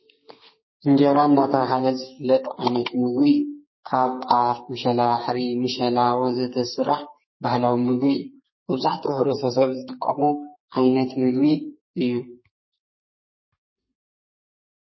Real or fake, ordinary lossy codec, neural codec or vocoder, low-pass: fake; MP3, 24 kbps; codec, 32 kHz, 1.9 kbps, SNAC; 7.2 kHz